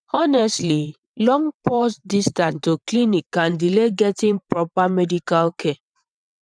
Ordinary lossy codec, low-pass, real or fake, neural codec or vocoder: none; 9.9 kHz; fake; vocoder, 22.05 kHz, 80 mel bands, WaveNeXt